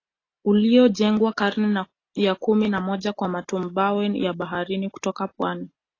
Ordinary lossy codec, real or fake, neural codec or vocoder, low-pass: AAC, 32 kbps; real; none; 7.2 kHz